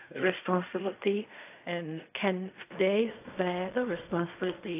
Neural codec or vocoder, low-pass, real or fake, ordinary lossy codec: codec, 16 kHz in and 24 kHz out, 0.4 kbps, LongCat-Audio-Codec, fine tuned four codebook decoder; 3.6 kHz; fake; AAC, 32 kbps